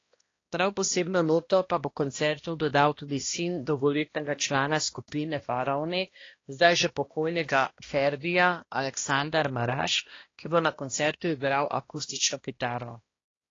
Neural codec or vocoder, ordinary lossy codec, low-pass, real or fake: codec, 16 kHz, 1 kbps, X-Codec, HuBERT features, trained on balanced general audio; AAC, 32 kbps; 7.2 kHz; fake